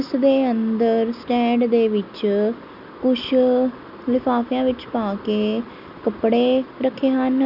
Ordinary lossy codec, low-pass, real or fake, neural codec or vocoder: none; 5.4 kHz; real; none